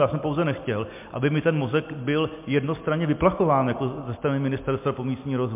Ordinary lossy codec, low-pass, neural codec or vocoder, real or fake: MP3, 32 kbps; 3.6 kHz; none; real